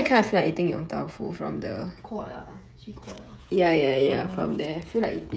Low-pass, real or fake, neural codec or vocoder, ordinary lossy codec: none; fake; codec, 16 kHz, 16 kbps, FreqCodec, smaller model; none